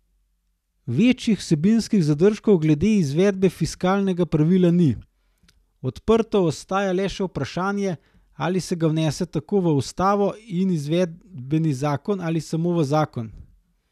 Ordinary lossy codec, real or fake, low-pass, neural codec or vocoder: none; real; 14.4 kHz; none